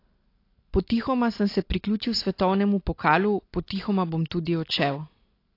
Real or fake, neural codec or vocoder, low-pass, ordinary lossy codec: real; none; 5.4 kHz; AAC, 32 kbps